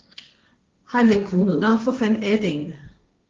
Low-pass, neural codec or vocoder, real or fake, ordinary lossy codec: 7.2 kHz; codec, 16 kHz, 1.1 kbps, Voila-Tokenizer; fake; Opus, 32 kbps